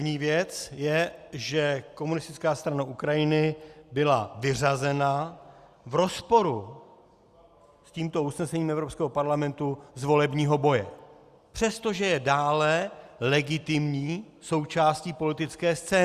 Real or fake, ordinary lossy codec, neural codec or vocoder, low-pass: real; Opus, 64 kbps; none; 14.4 kHz